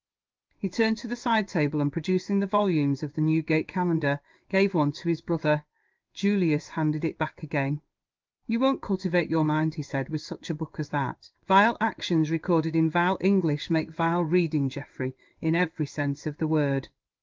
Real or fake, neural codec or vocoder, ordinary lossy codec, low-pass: fake; codec, 16 kHz in and 24 kHz out, 1 kbps, XY-Tokenizer; Opus, 32 kbps; 7.2 kHz